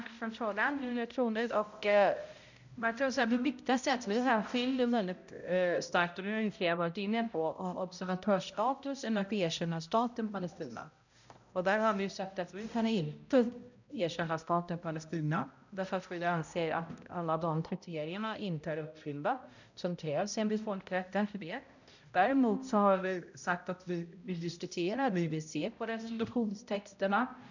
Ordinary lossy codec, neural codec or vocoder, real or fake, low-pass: none; codec, 16 kHz, 0.5 kbps, X-Codec, HuBERT features, trained on balanced general audio; fake; 7.2 kHz